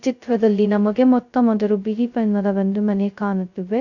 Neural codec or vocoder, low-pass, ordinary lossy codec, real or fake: codec, 16 kHz, 0.2 kbps, FocalCodec; 7.2 kHz; none; fake